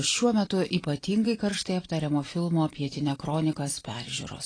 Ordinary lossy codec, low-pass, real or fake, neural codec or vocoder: AAC, 32 kbps; 9.9 kHz; real; none